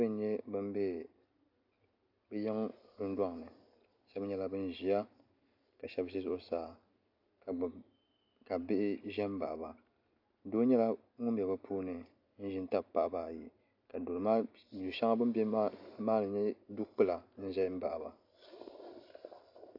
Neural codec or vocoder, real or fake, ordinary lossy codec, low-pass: none; real; AAC, 48 kbps; 5.4 kHz